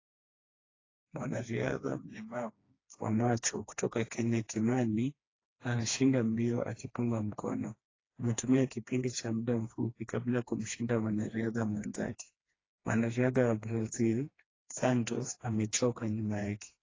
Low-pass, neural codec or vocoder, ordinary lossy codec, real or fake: 7.2 kHz; codec, 16 kHz, 2 kbps, FreqCodec, smaller model; AAC, 32 kbps; fake